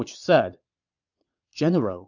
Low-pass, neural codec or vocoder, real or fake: 7.2 kHz; vocoder, 22.05 kHz, 80 mel bands, Vocos; fake